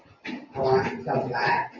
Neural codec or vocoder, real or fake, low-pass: none; real; 7.2 kHz